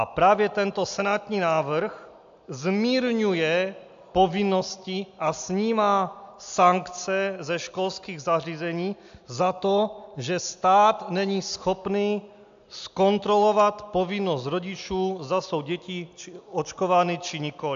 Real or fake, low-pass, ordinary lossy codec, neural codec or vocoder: real; 7.2 kHz; AAC, 64 kbps; none